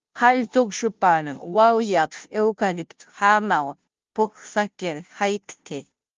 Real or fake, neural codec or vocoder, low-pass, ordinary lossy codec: fake; codec, 16 kHz, 0.5 kbps, FunCodec, trained on Chinese and English, 25 frames a second; 7.2 kHz; Opus, 32 kbps